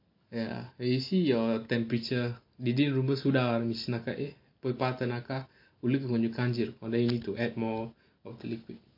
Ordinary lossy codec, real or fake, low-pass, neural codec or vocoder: MP3, 32 kbps; real; 5.4 kHz; none